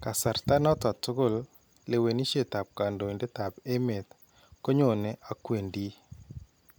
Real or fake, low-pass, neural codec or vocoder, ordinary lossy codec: real; none; none; none